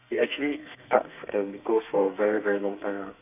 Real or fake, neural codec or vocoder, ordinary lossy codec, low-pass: fake; codec, 32 kHz, 1.9 kbps, SNAC; none; 3.6 kHz